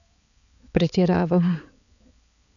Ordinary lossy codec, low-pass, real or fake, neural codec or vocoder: none; 7.2 kHz; fake; codec, 16 kHz, 4 kbps, X-Codec, HuBERT features, trained on balanced general audio